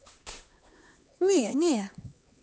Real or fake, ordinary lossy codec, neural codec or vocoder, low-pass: fake; none; codec, 16 kHz, 2 kbps, X-Codec, HuBERT features, trained on LibriSpeech; none